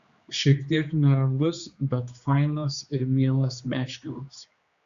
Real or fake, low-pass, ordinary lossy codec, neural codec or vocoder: fake; 7.2 kHz; Opus, 64 kbps; codec, 16 kHz, 2 kbps, X-Codec, HuBERT features, trained on general audio